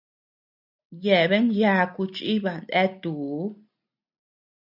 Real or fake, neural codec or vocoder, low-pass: real; none; 5.4 kHz